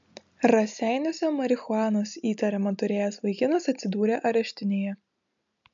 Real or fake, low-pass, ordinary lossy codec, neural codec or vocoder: real; 7.2 kHz; MP3, 64 kbps; none